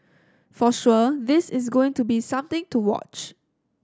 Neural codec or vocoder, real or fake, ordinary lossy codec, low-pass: none; real; none; none